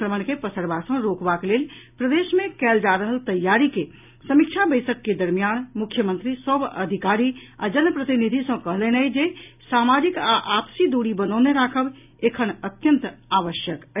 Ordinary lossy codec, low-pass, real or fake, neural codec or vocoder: none; 3.6 kHz; real; none